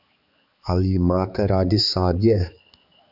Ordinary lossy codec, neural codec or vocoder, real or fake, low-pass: Opus, 64 kbps; codec, 16 kHz, 4 kbps, X-Codec, HuBERT features, trained on balanced general audio; fake; 5.4 kHz